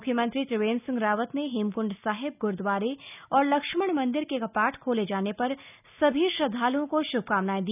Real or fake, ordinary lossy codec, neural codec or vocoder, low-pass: real; none; none; 3.6 kHz